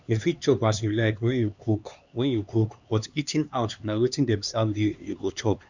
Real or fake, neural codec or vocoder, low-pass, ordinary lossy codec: fake; codec, 16 kHz, 2 kbps, X-Codec, HuBERT features, trained on LibriSpeech; 7.2 kHz; Opus, 64 kbps